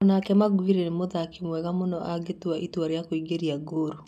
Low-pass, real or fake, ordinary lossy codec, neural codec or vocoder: 14.4 kHz; real; none; none